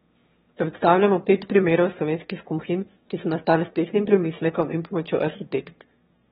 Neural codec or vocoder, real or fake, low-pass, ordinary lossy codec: autoencoder, 22.05 kHz, a latent of 192 numbers a frame, VITS, trained on one speaker; fake; 9.9 kHz; AAC, 16 kbps